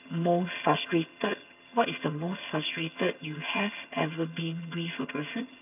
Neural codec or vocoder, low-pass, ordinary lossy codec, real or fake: vocoder, 22.05 kHz, 80 mel bands, HiFi-GAN; 3.6 kHz; none; fake